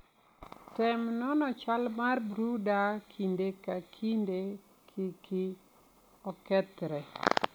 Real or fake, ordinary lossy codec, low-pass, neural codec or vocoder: real; none; none; none